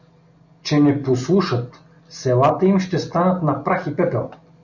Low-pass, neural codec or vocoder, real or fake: 7.2 kHz; none; real